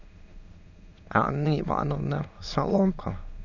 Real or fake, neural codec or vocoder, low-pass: fake; autoencoder, 22.05 kHz, a latent of 192 numbers a frame, VITS, trained on many speakers; 7.2 kHz